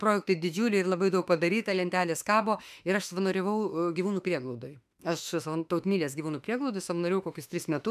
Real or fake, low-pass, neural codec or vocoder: fake; 14.4 kHz; autoencoder, 48 kHz, 32 numbers a frame, DAC-VAE, trained on Japanese speech